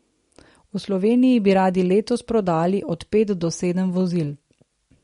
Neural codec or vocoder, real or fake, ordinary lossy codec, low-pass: none; real; MP3, 48 kbps; 10.8 kHz